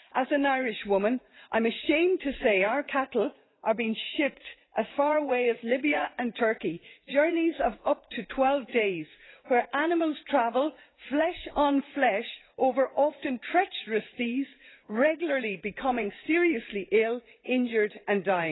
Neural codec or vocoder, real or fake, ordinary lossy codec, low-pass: vocoder, 44.1 kHz, 80 mel bands, Vocos; fake; AAC, 16 kbps; 7.2 kHz